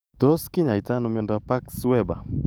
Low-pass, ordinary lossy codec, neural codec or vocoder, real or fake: none; none; codec, 44.1 kHz, 7.8 kbps, DAC; fake